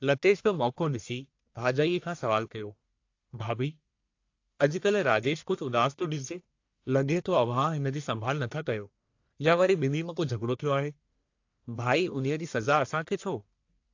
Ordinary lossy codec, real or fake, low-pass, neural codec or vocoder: AAC, 48 kbps; fake; 7.2 kHz; codec, 44.1 kHz, 1.7 kbps, Pupu-Codec